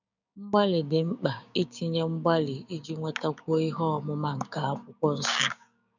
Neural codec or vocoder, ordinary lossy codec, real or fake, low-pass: codec, 16 kHz, 6 kbps, DAC; none; fake; 7.2 kHz